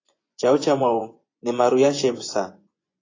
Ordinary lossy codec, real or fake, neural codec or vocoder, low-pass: AAC, 32 kbps; fake; vocoder, 24 kHz, 100 mel bands, Vocos; 7.2 kHz